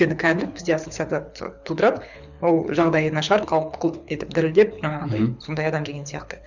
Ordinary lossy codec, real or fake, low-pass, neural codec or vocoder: none; fake; 7.2 kHz; codec, 24 kHz, 6 kbps, HILCodec